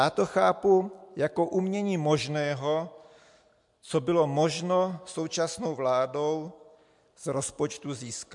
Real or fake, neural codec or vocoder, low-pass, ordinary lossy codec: real; none; 10.8 kHz; MP3, 64 kbps